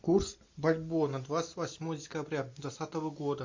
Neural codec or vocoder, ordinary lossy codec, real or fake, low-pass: none; MP3, 48 kbps; real; 7.2 kHz